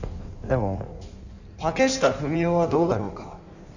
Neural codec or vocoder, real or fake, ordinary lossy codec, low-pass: codec, 16 kHz in and 24 kHz out, 1.1 kbps, FireRedTTS-2 codec; fake; none; 7.2 kHz